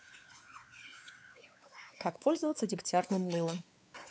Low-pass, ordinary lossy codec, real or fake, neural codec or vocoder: none; none; fake; codec, 16 kHz, 4 kbps, X-Codec, WavLM features, trained on Multilingual LibriSpeech